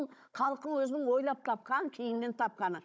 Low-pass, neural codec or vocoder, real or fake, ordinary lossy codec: none; codec, 16 kHz, 16 kbps, FunCodec, trained on Chinese and English, 50 frames a second; fake; none